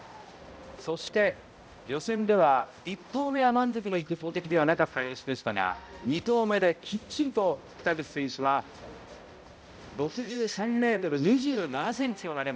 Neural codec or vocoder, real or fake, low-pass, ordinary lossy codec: codec, 16 kHz, 0.5 kbps, X-Codec, HuBERT features, trained on balanced general audio; fake; none; none